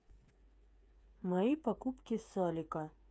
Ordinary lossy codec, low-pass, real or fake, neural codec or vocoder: none; none; fake; codec, 16 kHz, 8 kbps, FreqCodec, smaller model